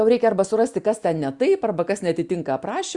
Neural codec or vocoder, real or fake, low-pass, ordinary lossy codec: none; real; 10.8 kHz; Opus, 64 kbps